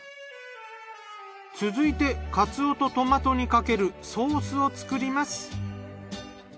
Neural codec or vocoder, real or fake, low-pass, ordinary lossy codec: none; real; none; none